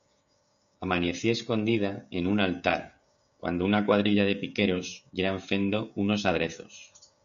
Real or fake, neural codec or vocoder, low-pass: fake; codec, 16 kHz, 16 kbps, FreqCodec, smaller model; 7.2 kHz